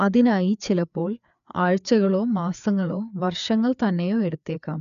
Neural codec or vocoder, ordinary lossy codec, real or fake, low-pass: codec, 16 kHz, 4 kbps, FreqCodec, larger model; none; fake; 7.2 kHz